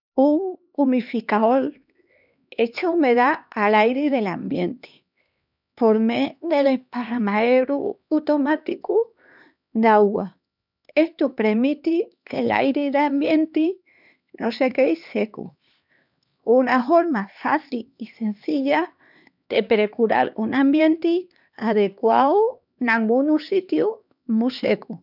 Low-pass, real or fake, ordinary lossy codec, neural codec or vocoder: 5.4 kHz; fake; none; codec, 16 kHz, 2 kbps, X-Codec, HuBERT features, trained on LibriSpeech